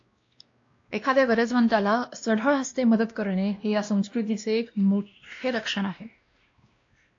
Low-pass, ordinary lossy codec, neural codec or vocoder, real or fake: 7.2 kHz; AAC, 48 kbps; codec, 16 kHz, 1 kbps, X-Codec, WavLM features, trained on Multilingual LibriSpeech; fake